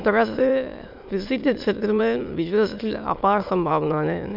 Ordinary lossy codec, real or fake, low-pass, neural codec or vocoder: MP3, 48 kbps; fake; 5.4 kHz; autoencoder, 22.05 kHz, a latent of 192 numbers a frame, VITS, trained on many speakers